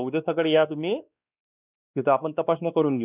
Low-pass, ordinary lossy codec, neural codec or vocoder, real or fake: 3.6 kHz; none; codec, 16 kHz, 2 kbps, X-Codec, WavLM features, trained on Multilingual LibriSpeech; fake